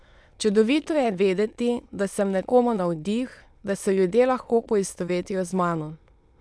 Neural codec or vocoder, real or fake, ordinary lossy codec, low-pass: autoencoder, 22.05 kHz, a latent of 192 numbers a frame, VITS, trained on many speakers; fake; none; none